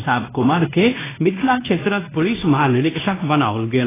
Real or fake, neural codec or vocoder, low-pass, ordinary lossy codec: fake; codec, 16 kHz in and 24 kHz out, 0.9 kbps, LongCat-Audio-Codec, fine tuned four codebook decoder; 3.6 kHz; AAC, 16 kbps